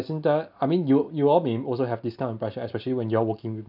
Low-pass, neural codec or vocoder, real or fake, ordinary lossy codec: 5.4 kHz; none; real; none